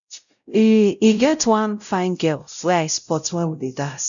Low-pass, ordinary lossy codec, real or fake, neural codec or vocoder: 7.2 kHz; none; fake; codec, 16 kHz, 0.5 kbps, X-Codec, WavLM features, trained on Multilingual LibriSpeech